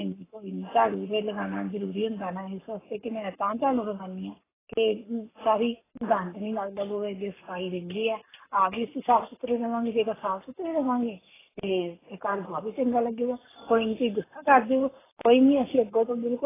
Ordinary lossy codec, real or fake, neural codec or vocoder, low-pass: AAC, 16 kbps; fake; codec, 44.1 kHz, 7.8 kbps, Pupu-Codec; 3.6 kHz